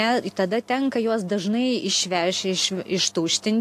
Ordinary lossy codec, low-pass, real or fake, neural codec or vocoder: AAC, 64 kbps; 14.4 kHz; real; none